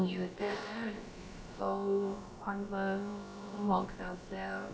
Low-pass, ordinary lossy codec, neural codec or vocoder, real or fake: none; none; codec, 16 kHz, about 1 kbps, DyCAST, with the encoder's durations; fake